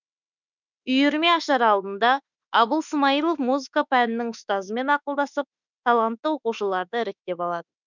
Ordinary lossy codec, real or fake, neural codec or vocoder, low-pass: none; fake; autoencoder, 48 kHz, 32 numbers a frame, DAC-VAE, trained on Japanese speech; 7.2 kHz